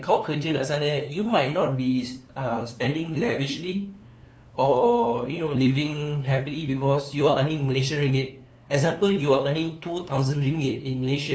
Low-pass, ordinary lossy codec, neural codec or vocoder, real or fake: none; none; codec, 16 kHz, 2 kbps, FunCodec, trained on LibriTTS, 25 frames a second; fake